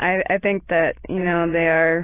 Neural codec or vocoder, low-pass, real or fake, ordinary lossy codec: none; 3.6 kHz; real; AAC, 16 kbps